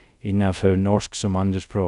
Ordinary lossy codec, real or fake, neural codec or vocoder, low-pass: none; fake; codec, 24 kHz, 0.5 kbps, DualCodec; 10.8 kHz